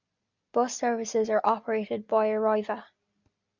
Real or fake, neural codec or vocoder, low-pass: real; none; 7.2 kHz